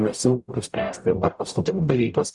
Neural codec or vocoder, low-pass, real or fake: codec, 44.1 kHz, 0.9 kbps, DAC; 10.8 kHz; fake